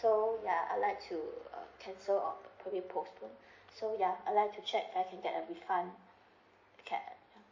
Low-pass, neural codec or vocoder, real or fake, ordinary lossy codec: 7.2 kHz; none; real; MP3, 32 kbps